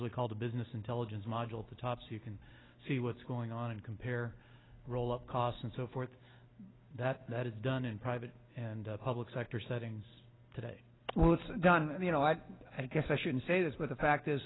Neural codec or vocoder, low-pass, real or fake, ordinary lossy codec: none; 7.2 kHz; real; AAC, 16 kbps